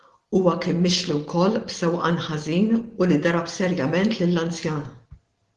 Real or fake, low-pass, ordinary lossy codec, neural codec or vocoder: real; 10.8 kHz; Opus, 16 kbps; none